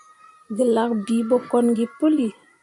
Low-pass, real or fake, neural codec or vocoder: 10.8 kHz; fake; vocoder, 44.1 kHz, 128 mel bands every 512 samples, BigVGAN v2